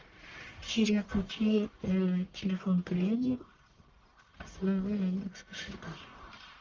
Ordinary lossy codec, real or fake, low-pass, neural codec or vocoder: Opus, 32 kbps; fake; 7.2 kHz; codec, 44.1 kHz, 1.7 kbps, Pupu-Codec